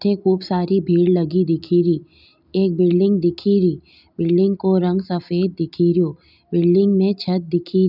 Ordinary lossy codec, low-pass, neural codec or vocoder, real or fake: none; 5.4 kHz; none; real